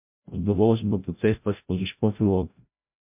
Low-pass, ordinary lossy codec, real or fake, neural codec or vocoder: 3.6 kHz; MP3, 32 kbps; fake; codec, 16 kHz, 0.5 kbps, FreqCodec, larger model